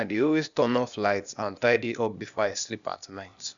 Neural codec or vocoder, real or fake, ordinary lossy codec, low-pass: codec, 16 kHz, 0.8 kbps, ZipCodec; fake; none; 7.2 kHz